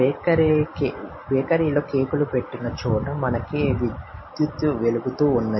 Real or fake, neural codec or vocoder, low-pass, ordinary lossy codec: real; none; 7.2 kHz; MP3, 24 kbps